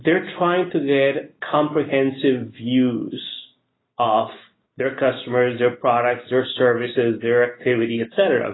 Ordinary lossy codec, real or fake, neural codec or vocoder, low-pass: AAC, 16 kbps; real; none; 7.2 kHz